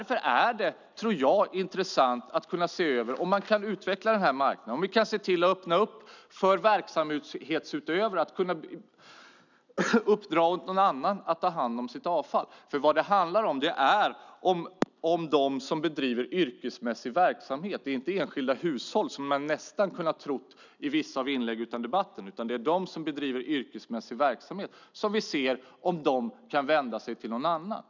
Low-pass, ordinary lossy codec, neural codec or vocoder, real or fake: 7.2 kHz; none; none; real